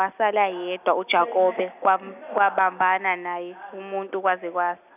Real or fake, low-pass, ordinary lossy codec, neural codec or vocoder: real; 3.6 kHz; none; none